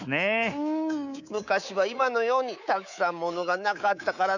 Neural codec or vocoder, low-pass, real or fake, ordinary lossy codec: codec, 24 kHz, 3.1 kbps, DualCodec; 7.2 kHz; fake; none